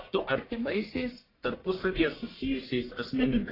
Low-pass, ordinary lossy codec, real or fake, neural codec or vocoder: 5.4 kHz; AAC, 24 kbps; fake; codec, 44.1 kHz, 1.7 kbps, Pupu-Codec